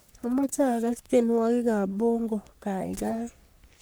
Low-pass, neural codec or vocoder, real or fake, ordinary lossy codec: none; codec, 44.1 kHz, 3.4 kbps, Pupu-Codec; fake; none